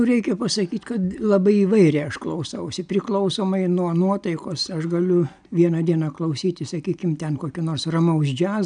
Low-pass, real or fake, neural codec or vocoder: 9.9 kHz; real; none